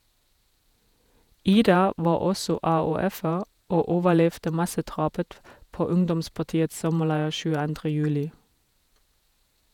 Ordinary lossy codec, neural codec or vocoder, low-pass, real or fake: none; vocoder, 48 kHz, 128 mel bands, Vocos; 19.8 kHz; fake